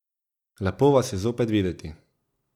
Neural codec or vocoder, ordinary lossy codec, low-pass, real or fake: none; none; 19.8 kHz; real